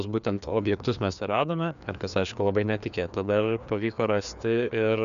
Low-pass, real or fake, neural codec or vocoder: 7.2 kHz; fake; codec, 16 kHz, 2 kbps, FreqCodec, larger model